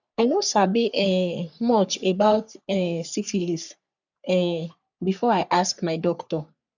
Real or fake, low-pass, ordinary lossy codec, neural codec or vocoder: fake; 7.2 kHz; none; codec, 44.1 kHz, 3.4 kbps, Pupu-Codec